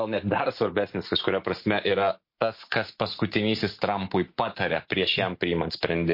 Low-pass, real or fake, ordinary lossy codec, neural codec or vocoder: 5.4 kHz; real; MP3, 32 kbps; none